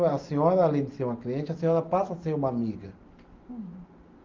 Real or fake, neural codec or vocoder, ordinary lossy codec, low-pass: real; none; Opus, 32 kbps; 7.2 kHz